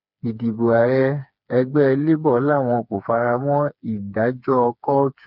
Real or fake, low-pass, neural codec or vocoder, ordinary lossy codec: fake; 5.4 kHz; codec, 16 kHz, 4 kbps, FreqCodec, smaller model; none